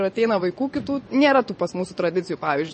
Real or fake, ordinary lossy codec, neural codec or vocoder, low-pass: fake; MP3, 32 kbps; vocoder, 24 kHz, 100 mel bands, Vocos; 10.8 kHz